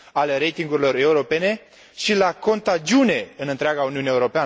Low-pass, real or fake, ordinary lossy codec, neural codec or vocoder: none; real; none; none